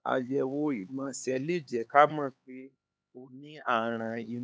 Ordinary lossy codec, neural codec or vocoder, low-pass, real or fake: none; codec, 16 kHz, 4 kbps, X-Codec, HuBERT features, trained on LibriSpeech; none; fake